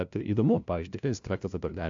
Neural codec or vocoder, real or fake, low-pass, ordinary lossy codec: codec, 16 kHz, 0.5 kbps, FunCodec, trained on LibriTTS, 25 frames a second; fake; 7.2 kHz; AAC, 64 kbps